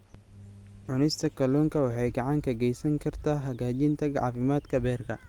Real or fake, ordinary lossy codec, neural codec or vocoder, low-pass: real; Opus, 32 kbps; none; 19.8 kHz